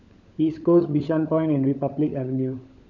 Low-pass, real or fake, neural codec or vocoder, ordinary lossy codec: 7.2 kHz; fake; codec, 16 kHz, 16 kbps, FunCodec, trained on LibriTTS, 50 frames a second; none